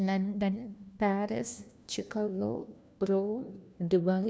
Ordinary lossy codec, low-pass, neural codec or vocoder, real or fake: none; none; codec, 16 kHz, 1 kbps, FunCodec, trained on LibriTTS, 50 frames a second; fake